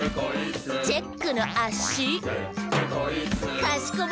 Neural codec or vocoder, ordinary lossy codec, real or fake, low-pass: none; none; real; none